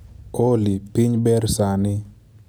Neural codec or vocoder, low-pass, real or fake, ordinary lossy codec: none; none; real; none